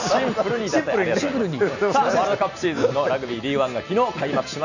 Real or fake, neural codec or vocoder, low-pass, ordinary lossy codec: real; none; 7.2 kHz; none